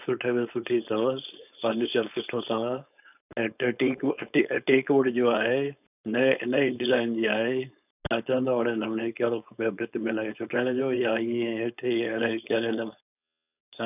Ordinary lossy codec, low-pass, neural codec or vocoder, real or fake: none; 3.6 kHz; codec, 16 kHz, 4.8 kbps, FACodec; fake